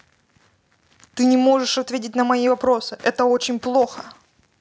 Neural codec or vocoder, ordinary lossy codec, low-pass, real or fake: none; none; none; real